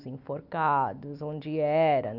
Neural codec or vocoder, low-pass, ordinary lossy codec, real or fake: none; 5.4 kHz; none; real